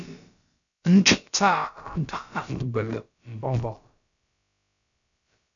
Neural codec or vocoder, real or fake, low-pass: codec, 16 kHz, about 1 kbps, DyCAST, with the encoder's durations; fake; 7.2 kHz